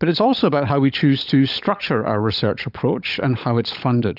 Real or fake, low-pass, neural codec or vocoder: fake; 5.4 kHz; codec, 16 kHz, 16 kbps, FunCodec, trained on LibriTTS, 50 frames a second